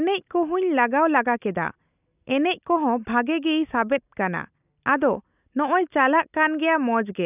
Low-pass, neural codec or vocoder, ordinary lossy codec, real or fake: 3.6 kHz; none; none; real